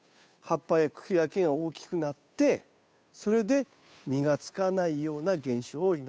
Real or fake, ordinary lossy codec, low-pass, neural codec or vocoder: fake; none; none; codec, 16 kHz, 2 kbps, FunCodec, trained on Chinese and English, 25 frames a second